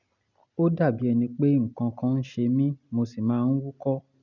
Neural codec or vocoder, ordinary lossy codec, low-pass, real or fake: none; none; 7.2 kHz; real